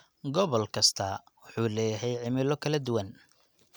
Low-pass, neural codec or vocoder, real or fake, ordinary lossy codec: none; none; real; none